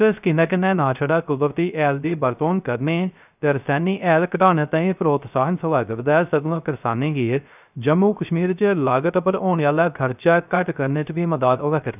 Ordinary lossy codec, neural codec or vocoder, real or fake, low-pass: none; codec, 16 kHz, 0.3 kbps, FocalCodec; fake; 3.6 kHz